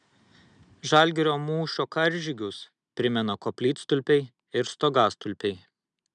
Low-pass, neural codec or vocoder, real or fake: 9.9 kHz; none; real